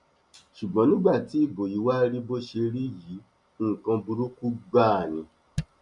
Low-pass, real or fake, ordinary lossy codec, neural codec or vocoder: 10.8 kHz; real; MP3, 64 kbps; none